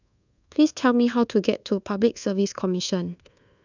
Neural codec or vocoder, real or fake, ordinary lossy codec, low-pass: codec, 24 kHz, 1.2 kbps, DualCodec; fake; none; 7.2 kHz